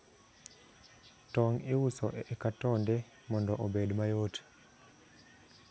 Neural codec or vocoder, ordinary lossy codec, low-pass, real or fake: none; none; none; real